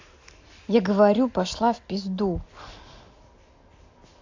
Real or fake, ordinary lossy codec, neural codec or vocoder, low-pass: real; AAC, 48 kbps; none; 7.2 kHz